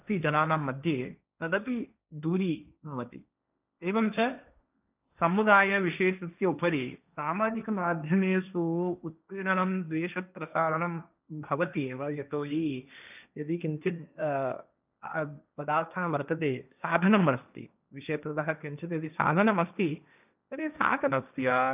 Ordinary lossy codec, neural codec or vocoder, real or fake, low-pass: none; codec, 16 kHz, 1.1 kbps, Voila-Tokenizer; fake; 3.6 kHz